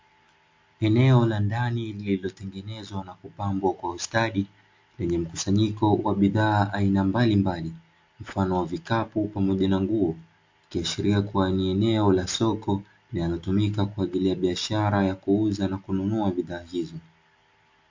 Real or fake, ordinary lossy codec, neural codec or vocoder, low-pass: real; MP3, 48 kbps; none; 7.2 kHz